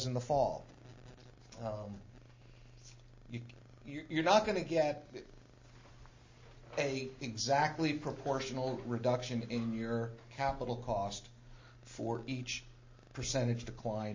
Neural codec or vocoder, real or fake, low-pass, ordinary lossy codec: none; real; 7.2 kHz; MP3, 32 kbps